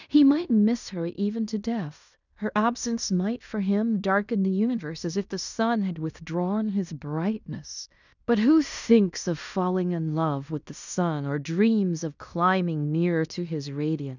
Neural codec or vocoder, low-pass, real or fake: codec, 16 kHz in and 24 kHz out, 0.9 kbps, LongCat-Audio-Codec, fine tuned four codebook decoder; 7.2 kHz; fake